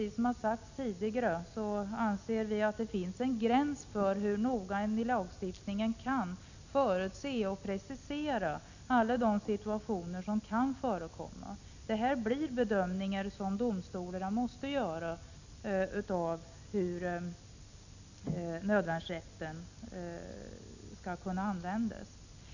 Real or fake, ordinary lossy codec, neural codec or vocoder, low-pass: real; none; none; 7.2 kHz